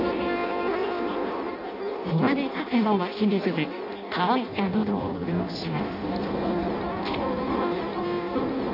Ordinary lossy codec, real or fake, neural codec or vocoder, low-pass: none; fake; codec, 16 kHz in and 24 kHz out, 0.6 kbps, FireRedTTS-2 codec; 5.4 kHz